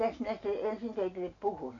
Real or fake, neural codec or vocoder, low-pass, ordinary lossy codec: real; none; 7.2 kHz; none